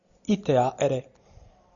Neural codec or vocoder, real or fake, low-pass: none; real; 7.2 kHz